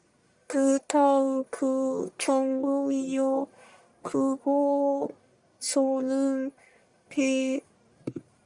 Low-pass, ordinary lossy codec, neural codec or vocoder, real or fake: 10.8 kHz; Opus, 64 kbps; codec, 44.1 kHz, 1.7 kbps, Pupu-Codec; fake